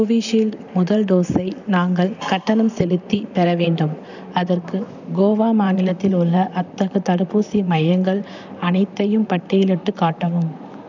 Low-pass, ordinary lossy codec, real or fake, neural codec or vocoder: 7.2 kHz; none; fake; vocoder, 44.1 kHz, 128 mel bands, Pupu-Vocoder